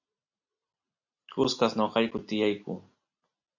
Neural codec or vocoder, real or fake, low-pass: none; real; 7.2 kHz